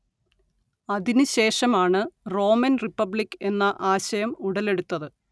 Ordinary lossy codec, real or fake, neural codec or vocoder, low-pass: none; real; none; none